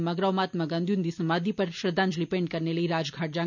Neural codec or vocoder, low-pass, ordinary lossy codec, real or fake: none; 7.2 kHz; none; real